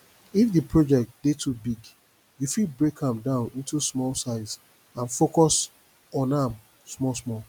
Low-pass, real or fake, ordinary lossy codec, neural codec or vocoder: none; real; none; none